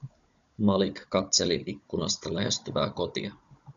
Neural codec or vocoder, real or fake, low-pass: codec, 16 kHz, 16 kbps, FunCodec, trained on Chinese and English, 50 frames a second; fake; 7.2 kHz